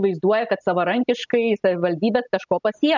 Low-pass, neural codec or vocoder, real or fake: 7.2 kHz; none; real